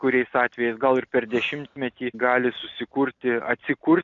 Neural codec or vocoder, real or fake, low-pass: none; real; 7.2 kHz